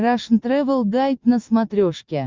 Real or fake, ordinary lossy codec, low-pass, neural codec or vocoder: real; Opus, 24 kbps; 7.2 kHz; none